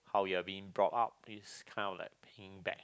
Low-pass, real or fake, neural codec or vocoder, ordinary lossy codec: none; real; none; none